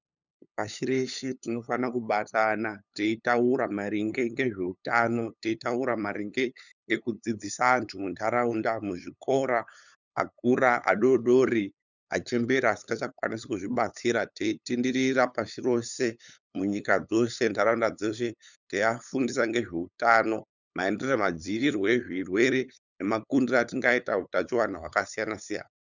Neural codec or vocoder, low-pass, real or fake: codec, 16 kHz, 8 kbps, FunCodec, trained on LibriTTS, 25 frames a second; 7.2 kHz; fake